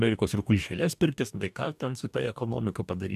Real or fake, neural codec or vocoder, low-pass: fake; codec, 44.1 kHz, 2.6 kbps, DAC; 14.4 kHz